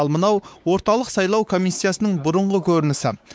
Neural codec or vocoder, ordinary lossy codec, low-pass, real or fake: codec, 16 kHz, 4 kbps, X-Codec, WavLM features, trained on Multilingual LibriSpeech; none; none; fake